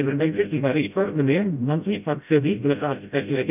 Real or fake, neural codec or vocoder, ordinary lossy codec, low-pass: fake; codec, 16 kHz, 0.5 kbps, FreqCodec, smaller model; none; 3.6 kHz